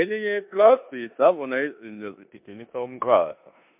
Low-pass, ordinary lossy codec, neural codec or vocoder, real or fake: 3.6 kHz; MP3, 32 kbps; codec, 16 kHz in and 24 kHz out, 0.9 kbps, LongCat-Audio-Codec, four codebook decoder; fake